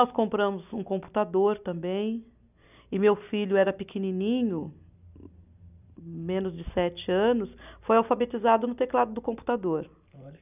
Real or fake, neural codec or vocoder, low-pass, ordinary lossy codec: real; none; 3.6 kHz; none